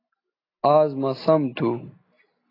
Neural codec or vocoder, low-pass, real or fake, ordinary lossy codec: none; 5.4 kHz; real; AAC, 24 kbps